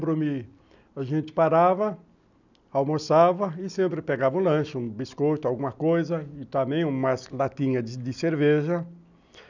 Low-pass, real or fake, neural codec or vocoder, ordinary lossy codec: 7.2 kHz; real; none; none